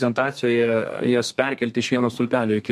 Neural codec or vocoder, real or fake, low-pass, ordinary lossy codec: codec, 32 kHz, 1.9 kbps, SNAC; fake; 14.4 kHz; MP3, 64 kbps